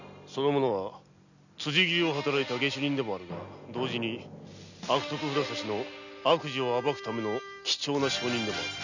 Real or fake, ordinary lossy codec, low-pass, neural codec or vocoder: real; none; 7.2 kHz; none